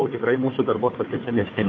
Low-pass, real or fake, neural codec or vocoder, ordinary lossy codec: 7.2 kHz; fake; codec, 16 kHz, 4 kbps, FunCodec, trained on Chinese and English, 50 frames a second; AAC, 32 kbps